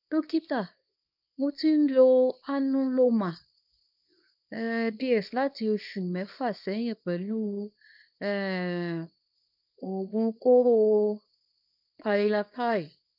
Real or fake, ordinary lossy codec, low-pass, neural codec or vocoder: fake; none; 5.4 kHz; codec, 24 kHz, 0.9 kbps, WavTokenizer, small release